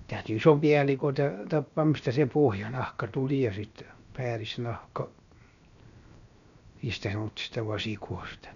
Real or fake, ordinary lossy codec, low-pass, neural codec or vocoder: fake; none; 7.2 kHz; codec, 16 kHz, 0.7 kbps, FocalCodec